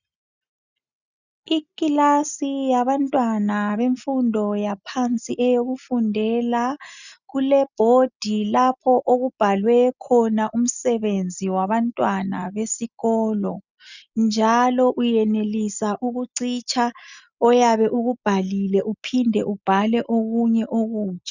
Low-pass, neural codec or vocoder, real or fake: 7.2 kHz; none; real